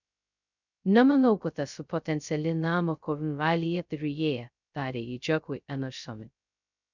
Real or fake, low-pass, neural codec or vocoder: fake; 7.2 kHz; codec, 16 kHz, 0.2 kbps, FocalCodec